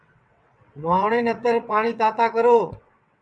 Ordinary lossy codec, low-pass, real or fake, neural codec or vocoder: MP3, 96 kbps; 9.9 kHz; fake; vocoder, 22.05 kHz, 80 mel bands, WaveNeXt